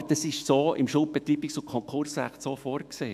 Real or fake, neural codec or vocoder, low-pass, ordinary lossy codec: fake; autoencoder, 48 kHz, 128 numbers a frame, DAC-VAE, trained on Japanese speech; 14.4 kHz; MP3, 96 kbps